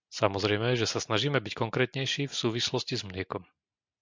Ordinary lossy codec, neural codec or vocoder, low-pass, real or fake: MP3, 64 kbps; none; 7.2 kHz; real